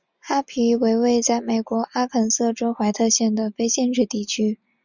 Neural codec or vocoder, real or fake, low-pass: none; real; 7.2 kHz